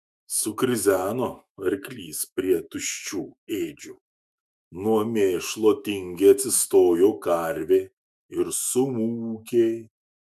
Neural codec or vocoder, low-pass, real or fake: autoencoder, 48 kHz, 128 numbers a frame, DAC-VAE, trained on Japanese speech; 14.4 kHz; fake